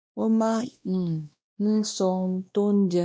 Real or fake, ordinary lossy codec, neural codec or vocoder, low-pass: fake; none; codec, 16 kHz, 1 kbps, X-Codec, WavLM features, trained on Multilingual LibriSpeech; none